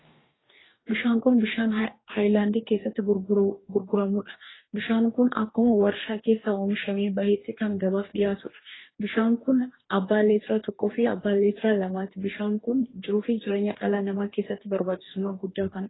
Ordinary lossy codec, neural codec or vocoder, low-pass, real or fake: AAC, 16 kbps; codec, 44.1 kHz, 2.6 kbps, DAC; 7.2 kHz; fake